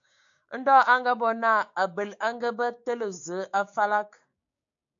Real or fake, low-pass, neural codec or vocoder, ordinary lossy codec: fake; 7.2 kHz; codec, 16 kHz, 6 kbps, DAC; AAC, 64 kbps